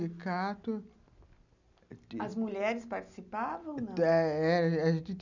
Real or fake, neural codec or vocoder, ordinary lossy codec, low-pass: real; none; none; 7.2 kHz